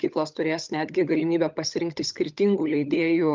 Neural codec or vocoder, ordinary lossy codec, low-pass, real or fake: codec, 16 kHz, 16 kbps, FunCodec, trained on LibriTTS, 50 frames a second; Opus, 24 kbps; 7.2 kHz; fake